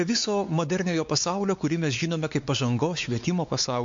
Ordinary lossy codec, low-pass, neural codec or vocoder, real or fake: MP3, 48 kbps; 7.2 kHz; codec, 16 kHz, 4 kbps, X-Codec, WavLM features, trained on Multilingual LibriSpeech; fake